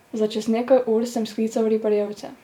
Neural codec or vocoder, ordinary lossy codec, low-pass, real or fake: vocoder, 44.1 kHz, 128 mel bands every 512 samples, BigVGAN v2; none; 19.8 kHz; fake